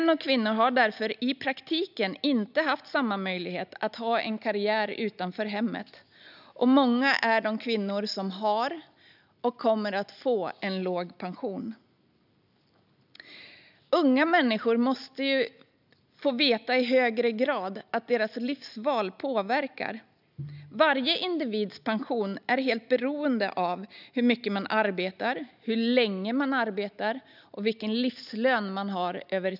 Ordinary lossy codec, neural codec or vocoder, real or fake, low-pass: none; none; real; 5.4 kHz